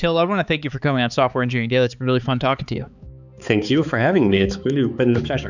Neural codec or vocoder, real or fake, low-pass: codec, 16 kHz, 4 kbps, X-Codec, HuBERT features, trained on balanced general audio; fake; 7.2 kHz